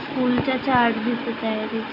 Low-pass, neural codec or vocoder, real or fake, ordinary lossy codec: 5.4 kHz; none; real; AAC, 32 kbps